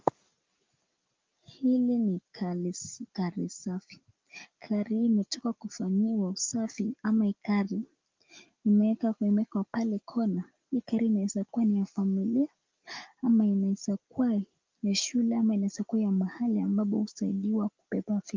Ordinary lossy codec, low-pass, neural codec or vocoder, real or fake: Opus, 32 kbps; 7.2 kHz; none; real